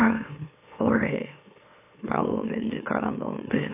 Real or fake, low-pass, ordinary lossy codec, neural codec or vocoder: fake; 3.6 kHz; AAC, 24 kbps; autoencoder, 44.1 kHz, a latent of 192 numbers a frame, MeloTTS